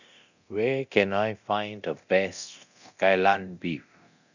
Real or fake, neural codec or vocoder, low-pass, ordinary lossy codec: fake; codec, 24 kHz, 0.9 kbps, DualCodec; 7.2 kHz; none